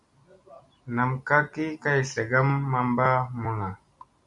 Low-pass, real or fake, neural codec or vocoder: 10.8 kHz; real; none